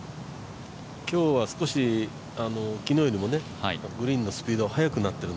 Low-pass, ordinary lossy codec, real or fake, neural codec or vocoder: none; none; real; none